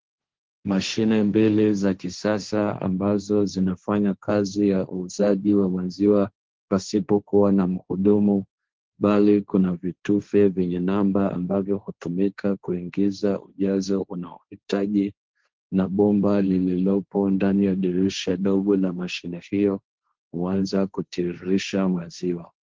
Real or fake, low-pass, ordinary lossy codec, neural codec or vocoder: fake; 7.2 kHz; Opus, 16 kbps; codec, 16 kHz, 1.1 kbps, Voila-Tokenizer